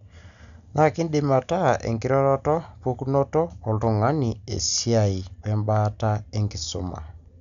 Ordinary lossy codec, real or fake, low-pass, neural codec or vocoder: none; real; 7.2 kHz; none